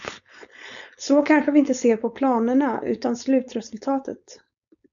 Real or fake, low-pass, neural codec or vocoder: fake; 7.2 kHz; codec, 16 kHz, 4.8 kbps, FACodec